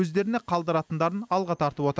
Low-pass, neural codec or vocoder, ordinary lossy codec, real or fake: none; none; none; real